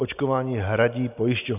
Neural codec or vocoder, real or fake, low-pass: none; real; 3.6 kHz